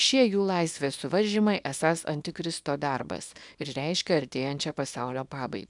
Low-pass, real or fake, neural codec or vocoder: 10.8 kHz; fake; codec, 24 kHz, 0.9 kbps, WavTokenizer, small release